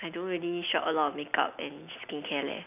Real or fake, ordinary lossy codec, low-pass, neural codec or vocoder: real; none; 3.6 kHz; none